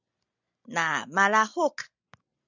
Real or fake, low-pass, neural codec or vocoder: real; 7.2 kHz; none